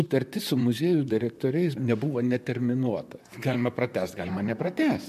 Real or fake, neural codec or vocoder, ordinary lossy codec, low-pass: fake; vocoder, 44.1 kHz, 128 mel bands, Pupu-Vocoder; MP3, 96 kbps; 14.4 kHz